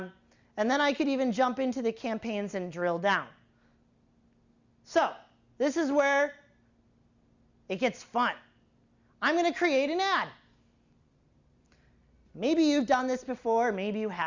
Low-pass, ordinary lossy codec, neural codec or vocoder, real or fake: 7.2 kHz; Opus, 64 kbps; none; real